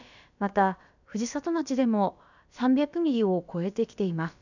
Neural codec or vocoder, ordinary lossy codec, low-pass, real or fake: codec, 16 kHz, about 1 kbps, DyCAST, with the encoder's durations; none; 7.2 kHz; fake